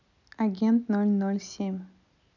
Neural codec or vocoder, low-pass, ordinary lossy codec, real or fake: none; 7.2 kHz; none; real